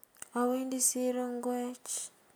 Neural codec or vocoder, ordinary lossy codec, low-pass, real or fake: none; none; none; real